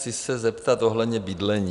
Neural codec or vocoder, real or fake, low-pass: none; real; 10.8 kHz